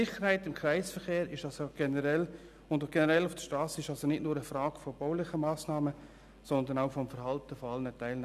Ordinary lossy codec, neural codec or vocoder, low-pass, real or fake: none; none; 14.4 kHz; real